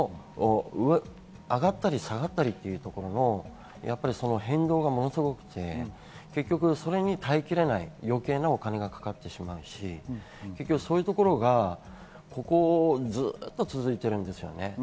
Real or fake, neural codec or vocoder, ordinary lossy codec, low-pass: real; none; none; none